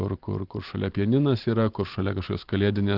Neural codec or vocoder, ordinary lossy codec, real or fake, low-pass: none; Opus, 16 kbps; real; 5.4 kHz